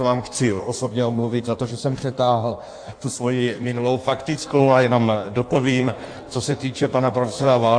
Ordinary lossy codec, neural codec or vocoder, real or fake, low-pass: AAC, 64 kbps; codec, 16 kHz in and 24 kHz out, 1.1 kbps, FireRedTTS-2 codec; fake; 9.9 kHz